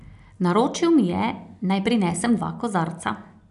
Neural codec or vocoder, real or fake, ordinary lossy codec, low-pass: none; real; none; 10.8 kHz